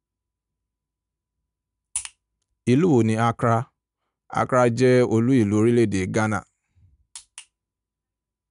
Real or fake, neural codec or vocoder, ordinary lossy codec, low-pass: real; none; none; 10.8 kHz